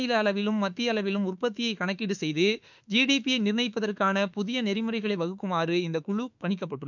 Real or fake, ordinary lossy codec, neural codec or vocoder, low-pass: fake; none; autoencoder, 48 kHz, 32 numbers a frame, DAC-VAE, trained on Japanese speech; 7.2 kHz